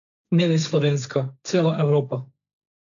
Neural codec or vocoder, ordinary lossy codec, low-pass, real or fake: codec, 16 kHz, 1.1 kbps, Voila-Tokenizer; none; 7.2 kHz; fake